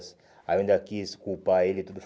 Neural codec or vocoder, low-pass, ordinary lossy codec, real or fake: none; none; none; real